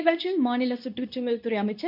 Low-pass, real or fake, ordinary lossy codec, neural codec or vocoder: 5.4 kHz; fake; none; codec, 24 kHz, 0.9 kbps, WavTokenizer, medium speech release version 2